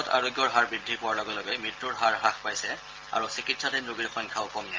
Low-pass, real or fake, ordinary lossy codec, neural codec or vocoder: 7.2 kHz; real; Opus, 32 kbps; none